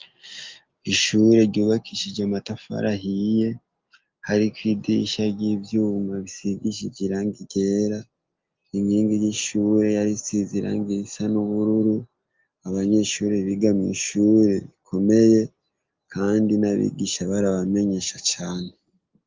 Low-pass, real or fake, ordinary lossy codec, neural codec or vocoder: 7.2 kHz; real; Opus, 24 kbps; none